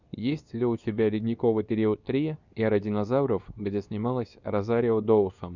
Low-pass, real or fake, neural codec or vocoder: 7.2 kHz; fake; codec, 24 kHz, 0.9 kbps, WavTokenizer, medium speech release version 2